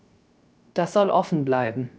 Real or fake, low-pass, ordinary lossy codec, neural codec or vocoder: fake; none; none; codec, 16 kHz, 0.3 kbps, FocalCodec